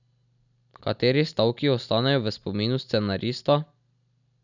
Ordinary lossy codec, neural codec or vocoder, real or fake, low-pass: none; none; real; 7.2 kHz